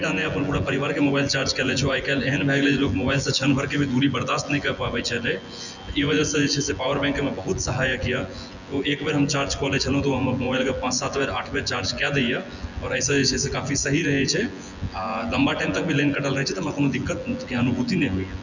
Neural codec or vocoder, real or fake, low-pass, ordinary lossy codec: vocoder, 24 kHz, 100 mel bands, Vocos; fake; 7.2 kHz; none